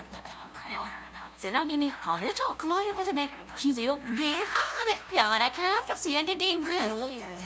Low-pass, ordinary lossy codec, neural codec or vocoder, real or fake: none; none; codec, 16 kHz, 0.5 kbps, FunCodec, trained on LibriTTS, 25 frames a second; fake